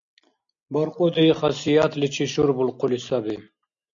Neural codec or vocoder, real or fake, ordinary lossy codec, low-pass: none; real; MP3, 64 kbps; 7.2 kHz